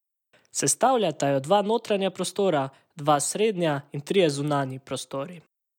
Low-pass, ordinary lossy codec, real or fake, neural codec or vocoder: 19.8 kHz; none; real; none